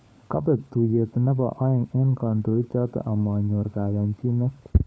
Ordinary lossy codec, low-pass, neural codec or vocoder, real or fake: none; none; codec, 16 kHz, 16 kbps, FunCodec, trained on LibriTTS, 50 frames a second; fake